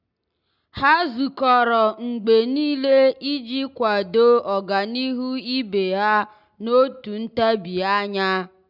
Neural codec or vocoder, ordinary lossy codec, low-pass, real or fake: none; none; 5.4 kHz; real